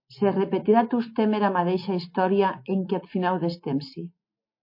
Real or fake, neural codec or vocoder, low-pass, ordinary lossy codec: real; none; 5.4 kHz; MP3, 32 kbps